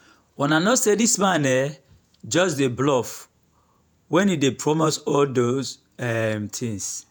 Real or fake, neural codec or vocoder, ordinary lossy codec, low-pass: fake; vocoder, 48 kHz, 128 mel bands, Vocos; none; none